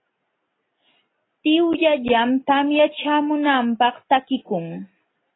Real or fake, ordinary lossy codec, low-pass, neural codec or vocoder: real; AAC, 16 kbps; 7.2 kHz; none